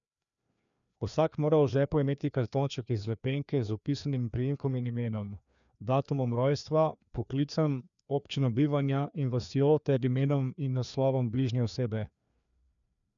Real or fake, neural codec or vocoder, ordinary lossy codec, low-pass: fake; codec, 16 kHz, 2 kbps, FreqCodec, larger model; none; 7.2 kHz